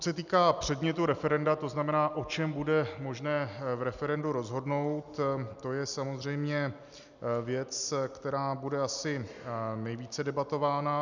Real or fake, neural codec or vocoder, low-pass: real; none; 7.2 kHz